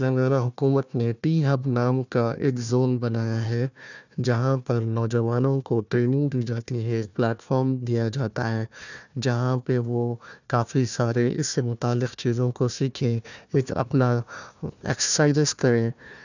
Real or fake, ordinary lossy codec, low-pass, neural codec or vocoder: fake; none; 7.2 kHz; codec, 16 kHz, 1 kbps, FunCodec, trained on Chinese and English, 50 frames a second